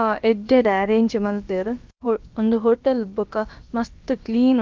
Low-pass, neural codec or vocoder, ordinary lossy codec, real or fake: 7.2 kHz; codec, 16 kHz, about 1 kbps, DyCAST, with the encoder's durations; Opus, 24 kbps; fake